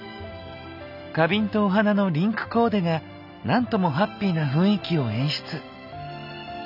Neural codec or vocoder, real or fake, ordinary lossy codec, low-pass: none; real; none; 5.4 kHz